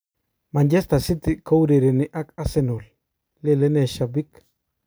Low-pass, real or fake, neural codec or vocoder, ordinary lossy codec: none; real; none; none